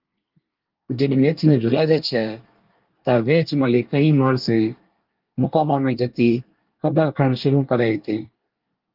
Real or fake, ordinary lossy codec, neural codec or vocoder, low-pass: fake; Opus, 32 kbps; codec, 24 kHz, 1 kbps, SNAC; 5.4 kHz